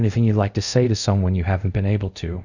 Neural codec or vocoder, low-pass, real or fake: codec, 24 kHz, 0.5 kbps, DualCodec; 7.2 kHz; fake